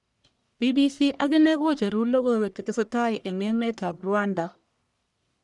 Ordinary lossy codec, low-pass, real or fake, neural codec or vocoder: none; 10.8 kHz; fake; codec, 44.1 kHz, 1.7 kbps, Pupu-Codec